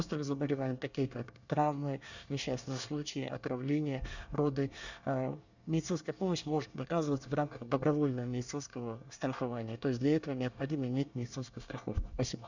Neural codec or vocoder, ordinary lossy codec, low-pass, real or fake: codec, 24 kHz, 1 kbps, SNAC; none; 7.2 kHz; fake